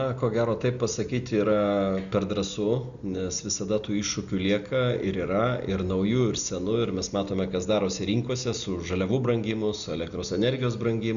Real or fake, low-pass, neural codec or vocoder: real; 7.2 kHz; none